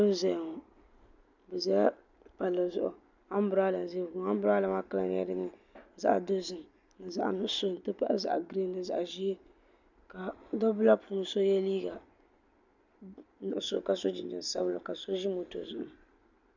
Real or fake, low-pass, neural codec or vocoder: real; 7.2 kHz; none